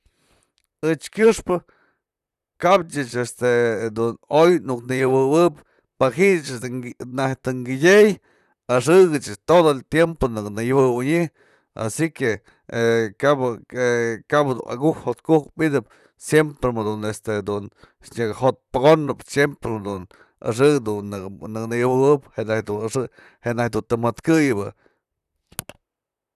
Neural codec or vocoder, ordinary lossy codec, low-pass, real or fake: vocoder, 44.1 kHz, 128 mel bands, Pupu-Vocoder; none; 14.4 kHz; fake